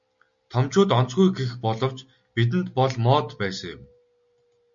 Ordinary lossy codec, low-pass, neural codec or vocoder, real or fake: MP3, 64 kbps; 7.2 kHz; none; real